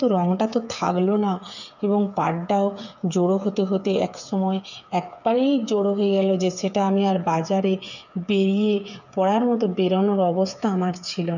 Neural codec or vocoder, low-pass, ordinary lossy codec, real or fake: codec, 16 kHz, 8 kbps, FreqCodec, smaller model; 7.2 kHz; none; fake